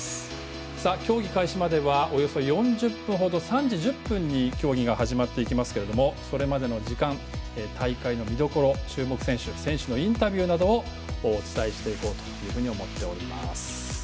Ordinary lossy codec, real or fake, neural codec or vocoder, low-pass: none; real; none; none